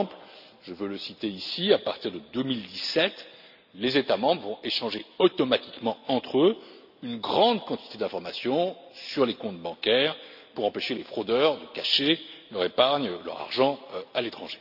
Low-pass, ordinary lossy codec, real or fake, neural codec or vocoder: 5.4 kHz; none; real; none